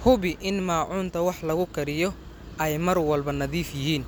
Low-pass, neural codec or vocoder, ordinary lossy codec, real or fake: none; none; none; real